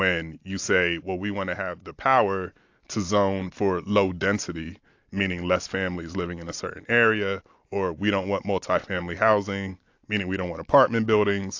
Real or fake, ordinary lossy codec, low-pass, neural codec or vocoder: real; AAC, 48 kbps; 7.2 kHz; none